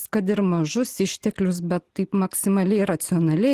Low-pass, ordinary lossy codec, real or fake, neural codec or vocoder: 14.4 kHz; Opus, 16 kbps; real; none